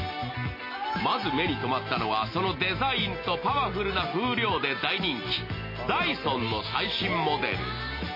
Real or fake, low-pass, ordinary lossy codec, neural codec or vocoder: real; 5.4 kHz; MP3, 24 kbps; none